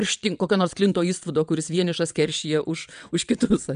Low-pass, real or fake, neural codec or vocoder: 9.9 kHz; fake; vocoder, 22.05 kHz, 80 mel bands, WaveNeXt